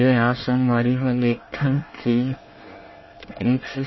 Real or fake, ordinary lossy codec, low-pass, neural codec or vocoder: fake; MP3, 24 kbps; 7.2 kHz; codec, 24 kHz, 1 kbps, SNAC